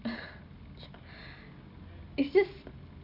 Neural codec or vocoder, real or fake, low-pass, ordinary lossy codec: none; real; 5.4 kHz; none